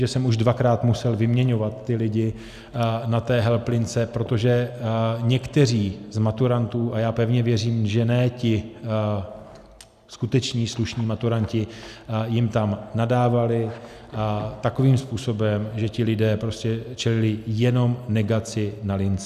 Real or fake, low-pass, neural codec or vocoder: real; 14.4 kHz; none